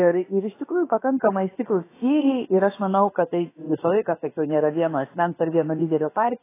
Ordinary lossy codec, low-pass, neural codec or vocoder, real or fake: AAC, 16 kbps; 3.6 kHz; codec, 16 kHz, about 1 kbps, DyCAST, with the encoder's durations; fake